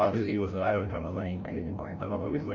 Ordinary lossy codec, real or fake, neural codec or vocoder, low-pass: none; fake; codec, 16 kHz, 0.5 kbps, FreqCodec, larger model; 7.2 kHz